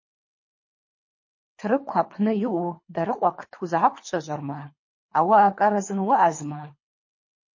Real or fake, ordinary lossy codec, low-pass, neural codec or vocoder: fake; MP3, 32 kbps; 7.2 kHz; codec, 24 kHz, 3 kbps, HILCodec